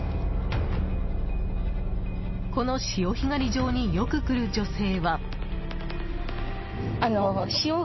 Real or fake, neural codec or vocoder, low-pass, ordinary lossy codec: real; none; 7.2 kHz; MP3, 24 kbps